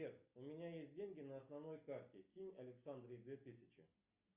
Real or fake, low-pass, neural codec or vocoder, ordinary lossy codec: real; 3.6 kHz; none; Opus, 64 kbps